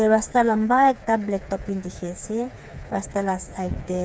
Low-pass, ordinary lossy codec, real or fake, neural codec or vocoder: none; none; fake; codec, 16 kHz, 4 kbps, FreqCodec, smaller model